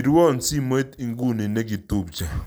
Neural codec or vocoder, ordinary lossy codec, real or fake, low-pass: none; none; real; none